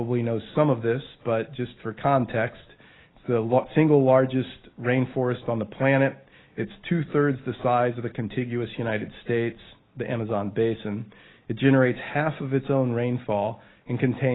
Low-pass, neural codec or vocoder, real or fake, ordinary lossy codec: 7.2 kHz; none; real; AAC, 16 kbps